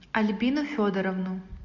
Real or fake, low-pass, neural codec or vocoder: real; 7.2 kHz; none